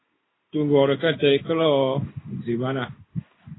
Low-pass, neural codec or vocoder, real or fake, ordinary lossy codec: 7.2 kHz; codec, 16 kHz in and 24 kHz out, 1 kbps, XY-Tokenizer; fake; AAC, 16 kbps